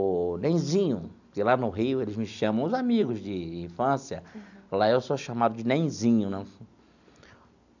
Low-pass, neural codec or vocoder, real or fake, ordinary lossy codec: 7.2 kHz; none; real; none